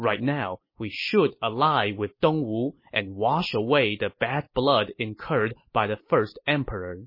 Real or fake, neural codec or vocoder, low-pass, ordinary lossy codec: real; none; 5.4 kHz; MP3, 24 kbps